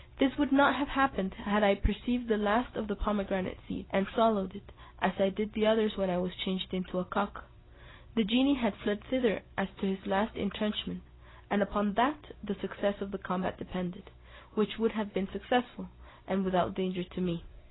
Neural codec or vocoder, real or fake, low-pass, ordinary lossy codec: none; real; 7.2 kHz; AAC, 16 kbps